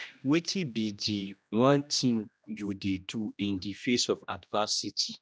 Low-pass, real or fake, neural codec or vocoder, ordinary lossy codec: none; fake; codec, 16 kHz, 1 kbps, X-Codec, HuBERT features, trained on general audio; none